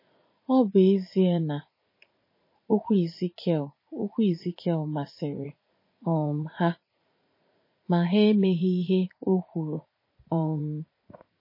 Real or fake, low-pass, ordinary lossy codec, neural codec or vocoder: fake; 5.4 kHz; MP3, 24 kbps; vocoder, 44.1 kHz, 80 mel bands, Vocos